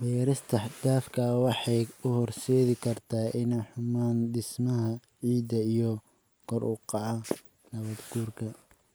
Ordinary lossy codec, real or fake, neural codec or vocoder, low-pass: none; real; none; none